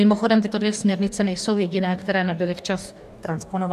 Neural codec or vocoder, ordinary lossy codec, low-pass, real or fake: codec, 44.1 kHz, 2.6 kbps, DAC; AAC, 96 kbps; 14.4 kHz; fake